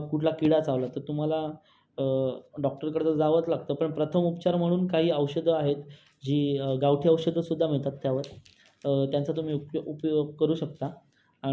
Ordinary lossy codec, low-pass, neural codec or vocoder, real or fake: none; none; none; real